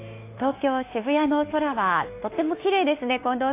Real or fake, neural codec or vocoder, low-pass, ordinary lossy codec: fake; autoencoder, 48 kHz, 32 numbers a frame, DAC-VAE, trained on Japanese speech; 3.6 kHz; AAC, 24 kbps